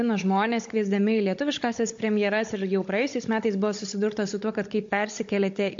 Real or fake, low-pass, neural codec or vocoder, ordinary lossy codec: fake; 7.2 kHz; codec, 16 kHz, 16 kbps, FunCodec, trained on Chinese and English, 50 frames a second; MP3, 48 kbps